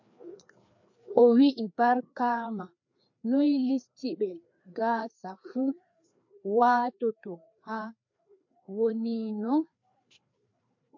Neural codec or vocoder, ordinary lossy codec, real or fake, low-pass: codec, 16 kHz, 2 kbps, FreqCodec, larger model; MP3, 48 kbps; fake; 7.2 kHz